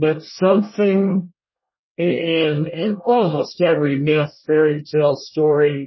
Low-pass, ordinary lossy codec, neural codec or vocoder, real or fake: 7.2 kHz; MP3, 24 kbps; codec, 24 kHz, 1 kbps, SNAC; fake